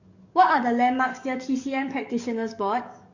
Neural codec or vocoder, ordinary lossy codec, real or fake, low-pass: codec, 44.1 kHz, 7.8 kbps, DAC; none; fake; 7.2 kHz